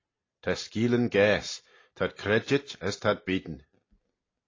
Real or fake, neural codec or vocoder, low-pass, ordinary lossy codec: real; none; 7.2 kHz; AAC, 32 kbps